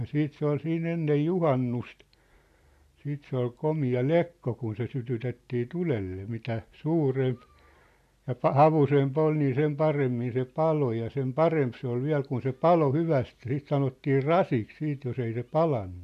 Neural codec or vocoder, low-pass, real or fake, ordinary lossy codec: none; 14.4 kHz; real; MP3, 96 kbps